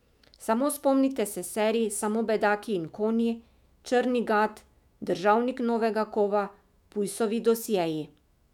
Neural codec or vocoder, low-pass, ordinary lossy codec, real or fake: autoencoder, 48 kHz, 128 numbers a frame, DAC-VAE, trained on Japanese speech; 19.8 kHz; none; fake